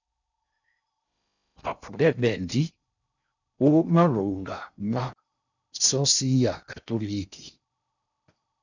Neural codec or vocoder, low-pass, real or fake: codec, 16 kHz in and 24 kHz out, 0.6 kbps, FocalCodec, streaming, 4096 codes; 7.2 kHz; fake